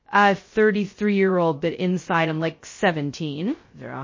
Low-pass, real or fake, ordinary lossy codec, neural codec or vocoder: 7.2 kHz; fake; MP3, 32 kbps; codec, 16 kHz, 0.2 kbps, FocalCodec